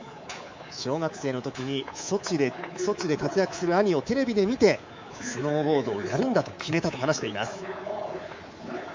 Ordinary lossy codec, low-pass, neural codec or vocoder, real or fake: MP3, 64 kbps; 7.2 kHz; codec, 24 kHz, 3.1 kbps, DualCodec; fake